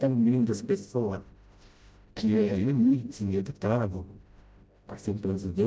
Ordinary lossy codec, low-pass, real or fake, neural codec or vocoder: none; none; fake; codec, 16 kHz, 0.5 kbps, FreqCodec, smaller model